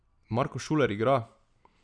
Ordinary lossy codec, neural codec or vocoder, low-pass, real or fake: none; none; 9.9 kHz; real